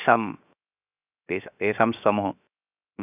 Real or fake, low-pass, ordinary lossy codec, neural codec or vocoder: fake; 3.6 kHz; none; codec, 16 kHz, 0.7 kbps, FocalCodec